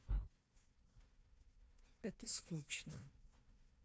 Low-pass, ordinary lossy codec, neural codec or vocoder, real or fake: none; none; codec, 16 kHz, 1 kbps, FunCodec, trained on Chinese and English, 50 frames a second; fake